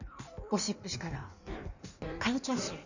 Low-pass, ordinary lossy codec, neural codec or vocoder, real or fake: 7.2 kHz; none; codec, 16 kHz in and 24 kHz out, 1.1 kbps, FireRedTTS-2 codec; fake